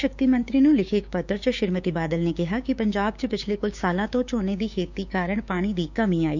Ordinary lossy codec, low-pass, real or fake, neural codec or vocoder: none; 7.2 kHz; fake; codec, 16 kHz, 6 kbps, DAC